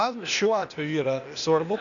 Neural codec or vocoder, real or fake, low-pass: codec, 16 kHz, 0.8 kbps, ZipCodec; fake; 7.2 kHz